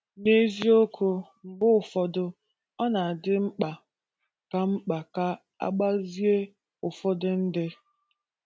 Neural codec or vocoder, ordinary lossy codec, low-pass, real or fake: none; none; none; real